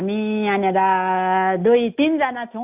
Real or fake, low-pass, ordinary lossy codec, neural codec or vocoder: real; 3.6 kHz; AAC, 32 kbps; none